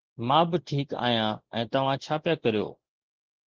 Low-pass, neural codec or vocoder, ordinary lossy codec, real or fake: 7.2 kHz; none; Opus, 16 kbps; real